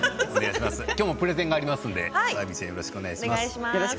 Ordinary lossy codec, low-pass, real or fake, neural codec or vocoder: none; none; real; none